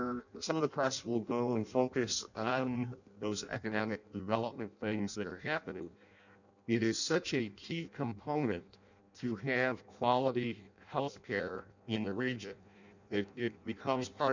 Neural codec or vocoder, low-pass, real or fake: codec, 16 kHz in and 24 kHz out, 0.6 kbps, FireRedTTS-2 codec; 7.2 kHz; fake